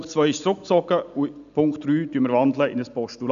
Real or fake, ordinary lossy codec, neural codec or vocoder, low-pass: real; AAC, 96 kbps; none; 7.2 kHz